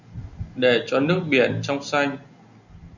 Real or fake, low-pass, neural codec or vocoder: real; 7.2 kHz; none